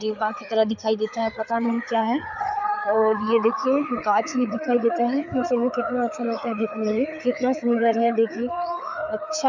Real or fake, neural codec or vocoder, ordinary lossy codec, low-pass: fake; codec, 16 kHz, 4 kbps, FreqCodec, larger model; none; 7.2 kHz